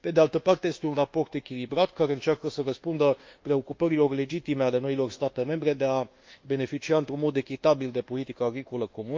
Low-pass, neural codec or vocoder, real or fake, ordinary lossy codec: 7.2 kHz; codec, 24 kHz, 1.2 kbps, DualCodec; fake; Opus, 24 kbps